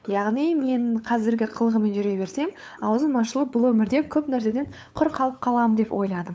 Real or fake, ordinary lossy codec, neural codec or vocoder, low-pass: fake; none; codec, 16 kHz, 8 kbps, FunCodec, trained on LibriTTS, 25 frames a second; none